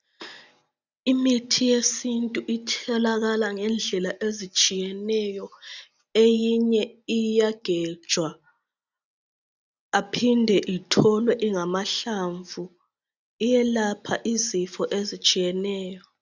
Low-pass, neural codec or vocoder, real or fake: 7.2 kHz; none; real